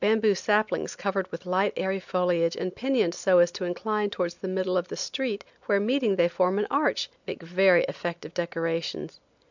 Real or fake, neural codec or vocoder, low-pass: real; none; 7.2 kHz